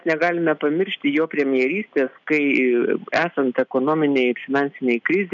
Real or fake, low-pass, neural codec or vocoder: real; 7.2 kHz; none